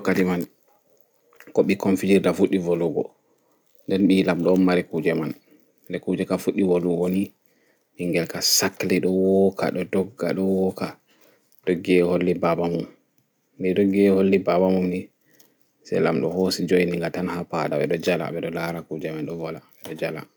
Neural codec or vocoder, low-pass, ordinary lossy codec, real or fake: none; none; none; real